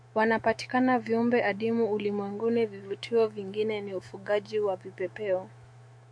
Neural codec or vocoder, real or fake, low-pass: autoencoder, 48 kHz, 128 numbers a frame, DAC-VAE, trained on Japanese speech; fake; 9.9 kHz